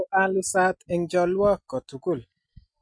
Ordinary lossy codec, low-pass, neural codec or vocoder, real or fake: MP3, 48 kbps; 9.9 kHz; none; real